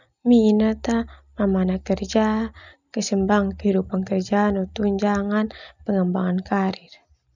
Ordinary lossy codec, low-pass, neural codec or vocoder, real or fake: none; 7.2 kHz; none; real